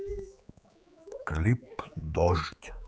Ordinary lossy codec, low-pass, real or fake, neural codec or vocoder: none; none; fake; codec, 16 kHz, 4 kbps, X-Codec, HuBERT features, trained on general audio